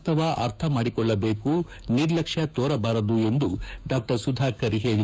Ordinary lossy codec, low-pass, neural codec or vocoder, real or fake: none; none; codec, 16 kHz, 6 kbps, DAC; fake